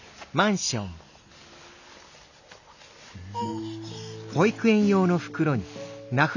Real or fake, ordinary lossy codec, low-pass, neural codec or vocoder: real; none; 7.2 kHz; none